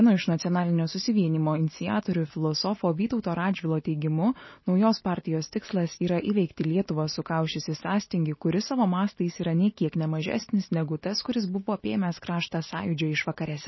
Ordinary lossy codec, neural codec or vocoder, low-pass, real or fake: MP3, 24 kbps; none; 7.2 kHz; real